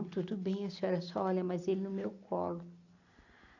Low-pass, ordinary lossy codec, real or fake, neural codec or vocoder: 7.2 kHz; none; real; none